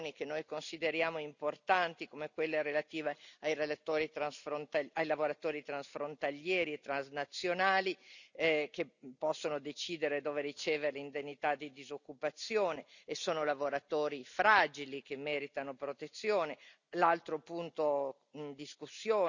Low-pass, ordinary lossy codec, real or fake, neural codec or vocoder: 7.2 kHz; none; real; none